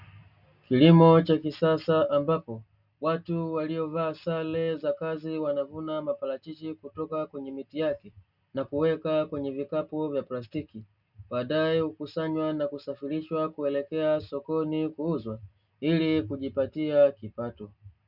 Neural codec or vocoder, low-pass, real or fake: none; 5.4 kHz; real